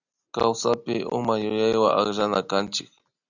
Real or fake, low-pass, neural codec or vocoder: real; 7.2 kHz; none